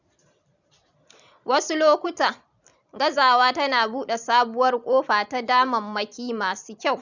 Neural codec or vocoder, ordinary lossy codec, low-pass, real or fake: vocoder, 44.1 kHz, 128 mel bands every 256 samples, BigVGAN v2; none; 7.2 kHz; fake